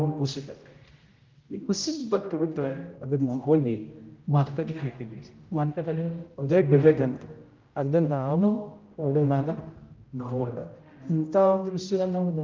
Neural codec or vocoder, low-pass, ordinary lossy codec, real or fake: codec, 16 kHz, 0.5 kbps, X-Codec, HuBERT features, trained on general audio; 7.2 kHz; Opus, 32 kbps; fake